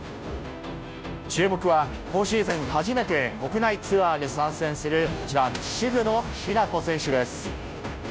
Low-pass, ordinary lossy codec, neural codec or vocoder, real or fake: none; none; codec, 16 kHz, 0.5 kbps, FunCodec, trained on Chinese and English, 25 frames a second; fake